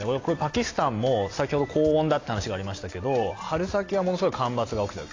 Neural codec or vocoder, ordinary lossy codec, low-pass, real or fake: none; AAC, 32 kbps; 7.2 kHz; real